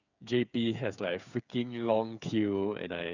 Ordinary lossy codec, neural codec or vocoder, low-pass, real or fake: none; codec, 16 kHz, 8 kbps, FreqCodec, smaller model; 7.2 kHz; fake